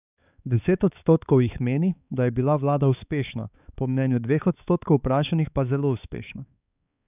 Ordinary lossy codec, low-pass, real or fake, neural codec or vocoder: none; 3.6 kHz; fake; codec, 16 kHz, 4 kbps, X-Codec, HuBERT features, trained on balanced general audio